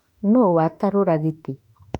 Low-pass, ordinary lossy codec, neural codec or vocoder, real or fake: 19.8 kHz; none; autoencoder, 48 kHz, 32 numbers a frame, DAC-VAE, trained on Japanese speech; fake